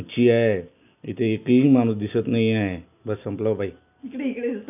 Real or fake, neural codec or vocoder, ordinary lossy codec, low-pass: real; none; none; 3.6 kHz